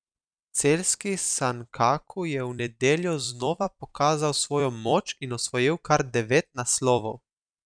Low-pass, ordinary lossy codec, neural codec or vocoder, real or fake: 9.9 kHz; none; vocoder, 44.1 kHz, 128 mel bands every 256 samples, BigVGAN v2; fake